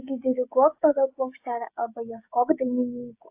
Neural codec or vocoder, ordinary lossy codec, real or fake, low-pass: codec, 16 kHz, 16 kbps, FreqCodec, smaller model; AAC, 32 kbps; fake; 3.6 kHz